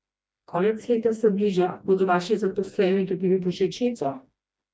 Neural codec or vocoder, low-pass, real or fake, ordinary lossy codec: codec, 16 kHz, 1 kbps, FreqCodec, smaller model; none; fake; none